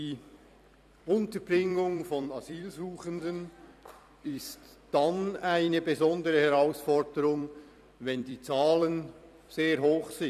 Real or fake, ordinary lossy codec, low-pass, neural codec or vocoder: fake; none; 14.4 kHz; vocoder, 44.1 kHz, 128 mel bands every 256 samples, BigVGAN v2